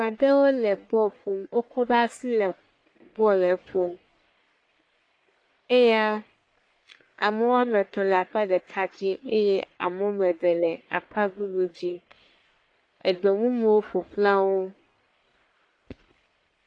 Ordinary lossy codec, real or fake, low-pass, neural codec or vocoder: AAC, 48 kbps; fake; 9.9 kHz; codec, 44.1 kHz, 1.7 kbps, Pupu-Codec